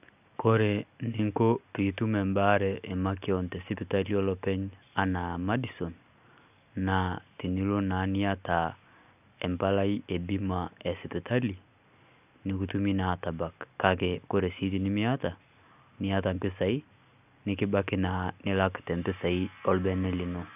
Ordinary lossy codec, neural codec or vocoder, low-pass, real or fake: none; none; 3.6 kHz; real